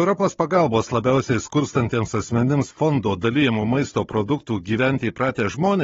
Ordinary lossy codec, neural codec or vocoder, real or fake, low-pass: AAC, 24 kbps; codec, 16 kHz, 16 kbps, FunCodec, trained on Chinese and English, 50 frames a second; fake; 7.2 kHz